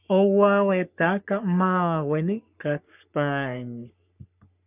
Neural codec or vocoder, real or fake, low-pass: codec, 44.1 kHz, 3.4 kbps, Pupu-Codec; fake; 3.6 kHz